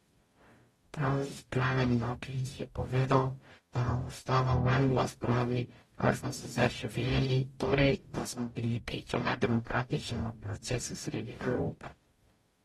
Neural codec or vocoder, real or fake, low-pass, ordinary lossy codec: codec, 44.1 kHz, 0.9 kbps, DAC; fake; 19.8 kHz; AAC, 32 kbps